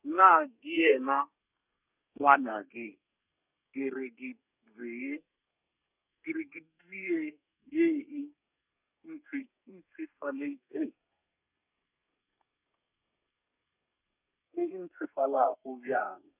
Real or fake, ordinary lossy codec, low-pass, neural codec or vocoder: fake; none; 3.6 kHz; codec, 32 kHz, 1.9 kbps, SNAC